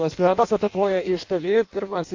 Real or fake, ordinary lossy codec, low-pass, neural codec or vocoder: fake; AAC, 48 kbps; 7.2 kHz; codec, 16 kHz in and 24 kHz out, 0.6 kbps, FireRedTTS-2 codec